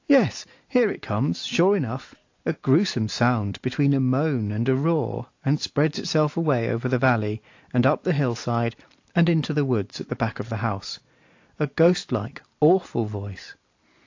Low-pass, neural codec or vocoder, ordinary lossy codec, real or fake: 7.2 kHz; none; AAC, 48 kbps; real